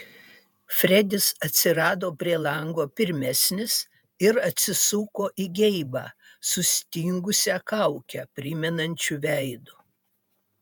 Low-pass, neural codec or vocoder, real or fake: 19.8 kHz; vocoder, 48 kHz, 128 mel bands, Vocos; fake